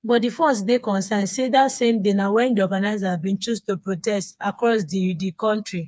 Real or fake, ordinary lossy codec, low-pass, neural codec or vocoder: fake; none; none; codec, 16 kHz, 4 kbps, FreqCodec, smaller model